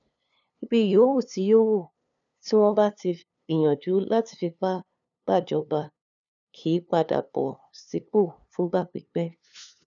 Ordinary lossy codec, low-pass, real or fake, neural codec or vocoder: none; 7.2 kHz; fake; codec, 16 kHz, 2 kbps, FunCodec, trained on LibriTTS, 25 frames a second